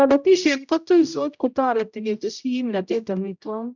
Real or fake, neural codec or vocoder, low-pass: fake; codec, 16 kHz, 0.5 kbps, X-Codec, HuBERT features, trained on general audio; 7.2 kHz